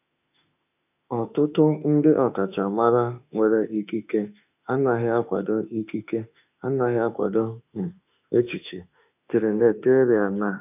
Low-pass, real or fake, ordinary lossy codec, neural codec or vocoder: 3.6 kHz; fake; none; autoencoder, 48 kHz, 32 numbers a frame, DAC-VAE, trained on Japanese speech